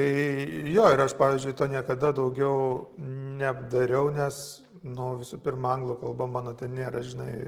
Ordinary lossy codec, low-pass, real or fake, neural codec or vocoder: Opus, 16 kbps; 19.8 kHz; real; none